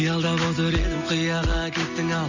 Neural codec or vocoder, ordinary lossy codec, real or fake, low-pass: none; none; real; 7.2 kHz